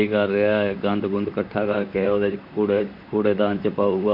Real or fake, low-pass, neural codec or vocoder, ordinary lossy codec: fake; 5.4 kHz; vocoder, 44.1 kHz, 128 mel bands, Pupu-Vocoder; none